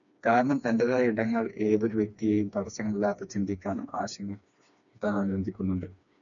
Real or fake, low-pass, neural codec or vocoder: fake; 7.2 kHz; codec, 16 kHz, 2 kbps, FreqCodec, smaller model